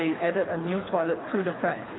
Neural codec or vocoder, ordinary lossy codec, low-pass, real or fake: codec, 24 kHz, 3 kbps, HILCodec; AAC, 16 kbps; 7.2 kHz; fake